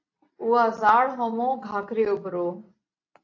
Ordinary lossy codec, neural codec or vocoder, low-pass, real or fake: AAC, 48 kbps; none; 7.2 kHz; real